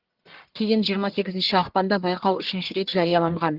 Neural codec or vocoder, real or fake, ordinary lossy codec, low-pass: codec, 44.1 kHz, 1.7 kbps, Pupu-Codec; fake; Opus, 16 kbps; 5.4 kHz